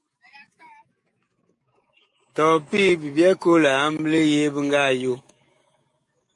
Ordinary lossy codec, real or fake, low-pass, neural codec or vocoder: MP3, 48 kbps; fake; 10.8 kHz; codec, 44.1 kHz, 7.8 kbps, DAC